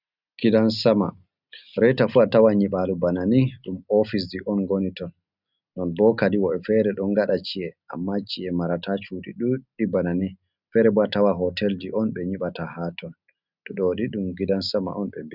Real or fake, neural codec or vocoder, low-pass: real; none; 5.4 kHz